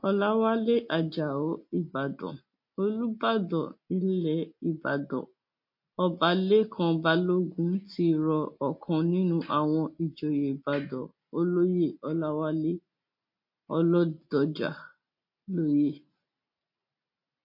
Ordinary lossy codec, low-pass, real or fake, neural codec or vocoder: MP3, 32 kbps; 5.4 kHz; real; none